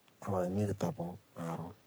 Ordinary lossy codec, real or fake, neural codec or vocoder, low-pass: none; fake; codec, 44.1 kHz, 3.4 kbps, Pupu-Codec; none